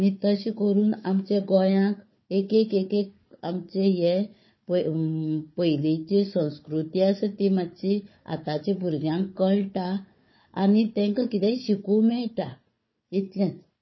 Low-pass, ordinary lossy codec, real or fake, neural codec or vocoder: 7.2 kHz; MP3, 24 kbps; fake; codec, 16 kHz, 4 kbps, FunCodec, trained on Chinese and English, 50 frames a second